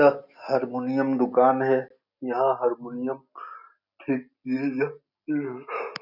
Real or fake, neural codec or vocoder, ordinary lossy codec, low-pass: real; none; none; 5.4 kHz